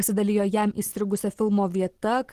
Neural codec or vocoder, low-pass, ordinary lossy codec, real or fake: none; 14.4 kHz; Opus, 16 kbps; real